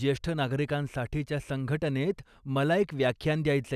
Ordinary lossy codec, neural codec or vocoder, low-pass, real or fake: none; none; 14.4 kHz; real